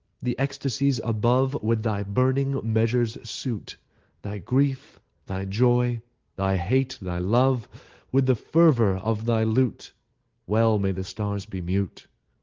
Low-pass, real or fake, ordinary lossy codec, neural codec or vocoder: 7.2 kHz; real; Opus, 16 kbps; none